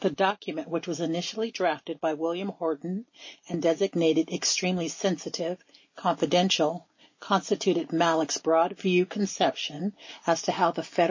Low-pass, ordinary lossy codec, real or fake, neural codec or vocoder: 7.2 kHz; MP3, 32 kbps; real; none